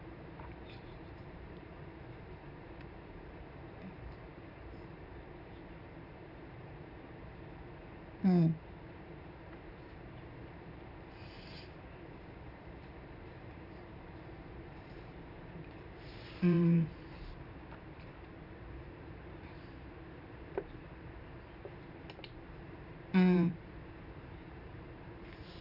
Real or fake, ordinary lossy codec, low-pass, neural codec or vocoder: fake; none; 5.4 kHz; vocoder, 44.1 kHz, 128 mel bands every 512 samples, BigVGAN v2